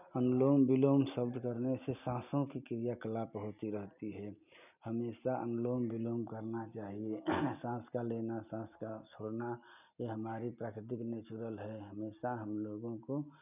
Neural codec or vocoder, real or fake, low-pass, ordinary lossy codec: none; real; 3.6 kHz; none